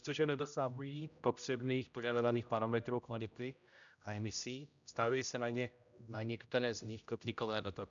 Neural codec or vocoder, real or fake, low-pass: codec, 16 kHz, 0.5 kbps, X-Codec, HuBERT features, trained on general audio; fake; 7.2 kHz